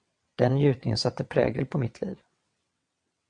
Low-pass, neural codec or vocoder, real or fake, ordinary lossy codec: 9.9 kHz; vocoder, 22.05 kHz, 80 mel bands, WaveNeXt; fake; AAC, 64 kbps